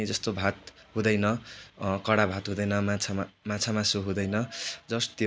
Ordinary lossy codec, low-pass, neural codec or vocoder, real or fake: none; none; none; real